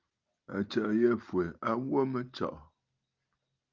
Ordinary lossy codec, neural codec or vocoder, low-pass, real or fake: Opus, 32 kbps; none; 7.2 kHz; real